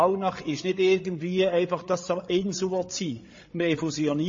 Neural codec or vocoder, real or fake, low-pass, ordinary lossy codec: codec, 16 kHz, 8 kbps, FreqCodec, larger model; fake; 7.2 kHz; MP3, 32 kbps